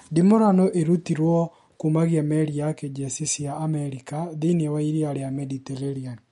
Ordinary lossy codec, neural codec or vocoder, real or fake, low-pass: MP3, 48 kbps; none; real; 19.8 kHz